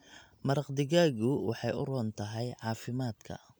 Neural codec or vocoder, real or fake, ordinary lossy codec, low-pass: none; real; none; none